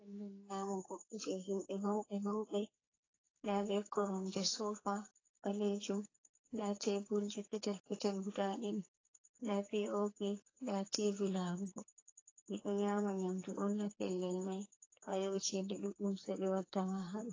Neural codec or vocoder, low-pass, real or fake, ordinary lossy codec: codec, 44.1 kHz, 2.6 kbps, SNAC; 7.2 kHz; fake; AAC, 32 kbps